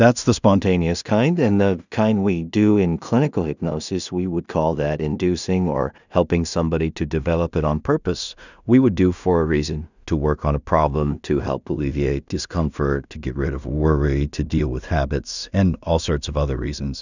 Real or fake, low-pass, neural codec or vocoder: fake; 7.2 kHz; codec, 16 kHz in and 24 kHz out, 0.4 kbps, LongCat-Audio-Codec, two codebook decoder